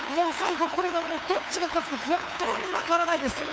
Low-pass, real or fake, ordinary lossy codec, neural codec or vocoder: none; fake; none; codec, 16 kHz, 2 kbps, FunCodec, trained on LibriTTS, 25 frames a second